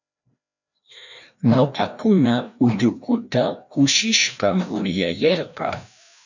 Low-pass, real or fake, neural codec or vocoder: 7.2 kHz; fake; codec, 16 kHz, 1 kbps, FreqCodec, larger model